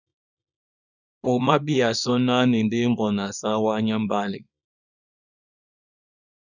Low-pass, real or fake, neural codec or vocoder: 7.2 kHz; fake; codec, 24 kHz, 0.9 kbps, WavTokenizer, small release